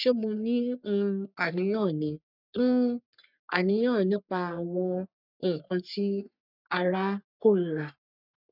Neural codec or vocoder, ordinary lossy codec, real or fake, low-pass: codec, 44.1 kHz, 3.4 kbps, Pupu-Codec; none; fake; 5.4 kHz